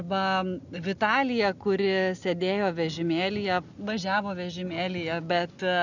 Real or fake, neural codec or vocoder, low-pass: real; none; 7.2 kHz